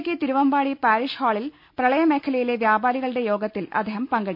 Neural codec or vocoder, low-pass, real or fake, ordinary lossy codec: none; 5.4 kHz; real; none